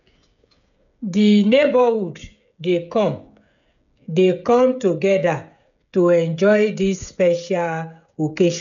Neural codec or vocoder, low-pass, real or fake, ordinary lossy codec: codec, 16 kHz, 16 kbps, FreqCodec, smaller model; 7.2 kHz; fake; none